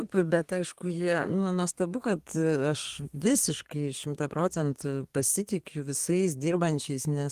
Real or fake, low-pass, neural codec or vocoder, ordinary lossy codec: fake; 14.4 kHz; codec, 32 kHz, 1.9 kbps, SNAC; Opus, 24 kbps